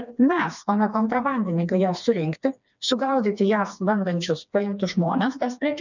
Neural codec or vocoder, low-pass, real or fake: codec, 16 kHz, 2 kbps, FreqCodec, smaller model; 7.2 kHz; fake